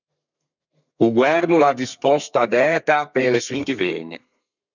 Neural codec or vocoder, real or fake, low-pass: codec, 32 kHz, 1.9 kbps, SNAC; fake; 7.2 kHz